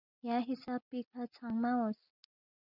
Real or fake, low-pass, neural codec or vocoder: real; 5.4 kHz; none